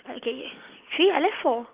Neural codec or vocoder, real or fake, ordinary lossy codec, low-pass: none; real; Opus, 32 kbps; 3.6 kHz